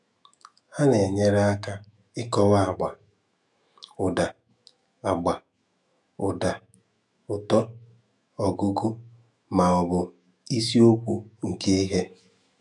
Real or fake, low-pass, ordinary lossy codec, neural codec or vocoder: fake; 10.8 kHz; none; autoencoder, 48 kHz, 128 numbers a frame, DAC-VAE, trained on Japanese speech